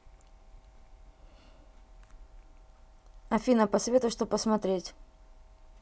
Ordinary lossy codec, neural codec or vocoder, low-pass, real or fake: none; none; none; real